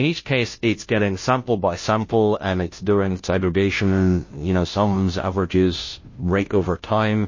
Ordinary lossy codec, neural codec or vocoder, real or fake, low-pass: MP3, 32 kbps; codec, 16 kHz, 0.5 kbps, FunCodec, trained on Chinese and English, 25 frames a second; fake; 7.2 kHz